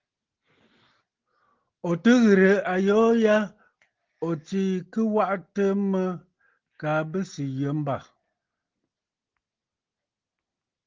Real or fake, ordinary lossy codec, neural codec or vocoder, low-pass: real; Opus, 16 kbps; none; 7.2 kHz